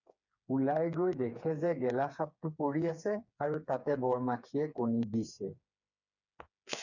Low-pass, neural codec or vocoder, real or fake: 7.2 kHz; codec, 16 kHz, 4 kbps, FreqCodec, smaller model; fake